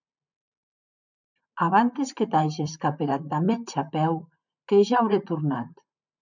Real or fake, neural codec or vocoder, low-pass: fake; vocoder, 44.1 kHz, 128 mel bands, Pupu-Vocoder; 7.2 kHz